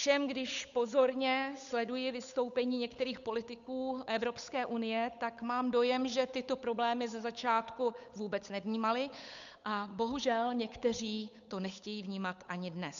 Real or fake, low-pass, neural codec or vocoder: fake; 7.2 kHz; codec, 16 kHz, 8 kbps, FunCodec, trained on Chinese and English, 25 frames a second